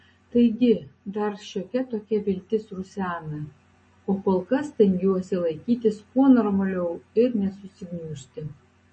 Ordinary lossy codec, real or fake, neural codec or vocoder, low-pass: MP3, 32 kbps; real; none; 9.9 kHz